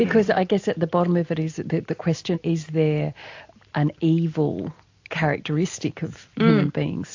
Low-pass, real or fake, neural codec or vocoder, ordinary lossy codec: 7.2 kHz; real; none; AAC, 48 kbps